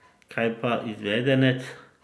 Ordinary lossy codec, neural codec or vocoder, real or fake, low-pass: none; none; real; none